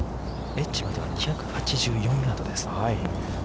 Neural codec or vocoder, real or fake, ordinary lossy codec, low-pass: none; real; none; none